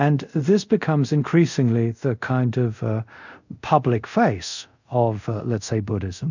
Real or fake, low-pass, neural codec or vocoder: fake; 7.2 kHz; codec, 24 kHz, 0.5 kbps, DualCodec